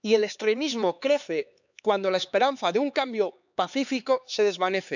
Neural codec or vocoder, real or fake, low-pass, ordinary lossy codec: codec, 16 kHz, 4 kbps, X-Codec, HuBERT features, trained on LibriSpeech; fake; 7.2 kHz; none